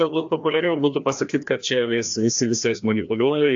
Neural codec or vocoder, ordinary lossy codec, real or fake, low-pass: codec, 16 kHz, 1 kbps, FreqCodec, larger model; AAC, 64 kbps; fake; 7.2 kHz